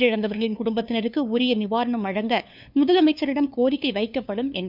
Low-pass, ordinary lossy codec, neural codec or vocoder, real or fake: 5.4 kHz; none; codec, 16 kHz, 2 kbps, FunCodec, trained on LibriTTS, 25 frames a second; fake